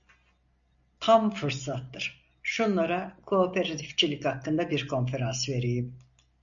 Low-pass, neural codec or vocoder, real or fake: 7.2 kHz; none; real